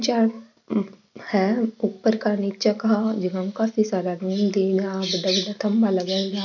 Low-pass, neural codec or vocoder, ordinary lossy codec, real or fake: 7.2 kHz; none; none; real